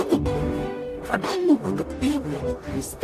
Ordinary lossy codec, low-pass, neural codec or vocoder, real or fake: AAC, 64 kbps; 14.4 kHz; codec, 44.1 kHz, 0.9 kbps, DAC; fake